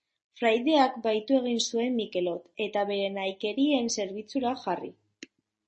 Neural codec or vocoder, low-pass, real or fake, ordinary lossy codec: none; 10.8 kHz; real; MP3, 32 kbps